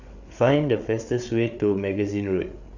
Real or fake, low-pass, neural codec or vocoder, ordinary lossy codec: fake; 7.2 kHz; codec, 16 kHz, 8 kbps, FreqCodec, larger model; none